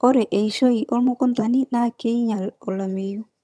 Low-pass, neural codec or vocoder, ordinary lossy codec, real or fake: none; vocoder, 22.05 kHz, 80 mel bands, HiFi-GAN; none; fake